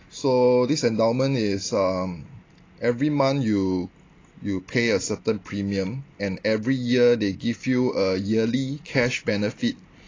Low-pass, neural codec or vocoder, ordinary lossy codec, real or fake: 7.2 kHz; none; AAC, 32 kbps; real